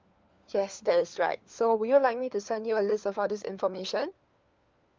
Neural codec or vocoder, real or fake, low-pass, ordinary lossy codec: codec, 16 kHz, 4 kbps, FunCodec, trained on LibriTTS, 50 frames a second; fake; 7.2 kHz; Opus, 32 kbps